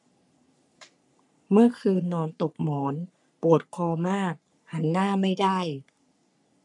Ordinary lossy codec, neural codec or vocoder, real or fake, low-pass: none; codec, 44.1 kHz, 3.4 kbps, Pupu-Codec; fake; 10.8 kHz